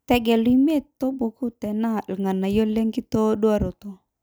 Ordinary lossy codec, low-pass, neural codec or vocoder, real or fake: none; none; none; real